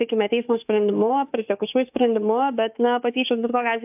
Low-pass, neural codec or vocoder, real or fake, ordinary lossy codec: 3.6 kHz; autoencoder, 48 kHz, 32 numbers a frame, DAC-VAE, trained on Japanese speech; fake; AAC, 32 kbps